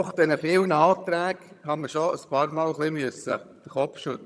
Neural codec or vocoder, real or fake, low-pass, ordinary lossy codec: vocoder, 22.05 kHz, 80 mel bands, HiFi-GAN; fake; none; none